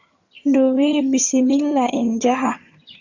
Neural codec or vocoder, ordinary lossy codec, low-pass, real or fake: vocoder, 22.05 kHz, 80 mel bands, HiFi-GAN; Opus, 64 kbps; 7.2 kHz; fake